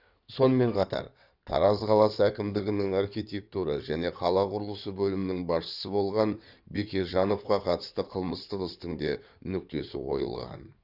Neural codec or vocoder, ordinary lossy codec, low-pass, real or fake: codec, 16 kHz in and 24 kHz out, 2.2 kbps, FireRedTTS-2 codec; none; 5.4 kHz; fake